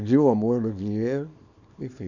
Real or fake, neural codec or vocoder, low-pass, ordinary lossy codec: fake; codec, 24 kHz, 0.9 kbps, WavTokenizer, small release; 7.2 kHz; none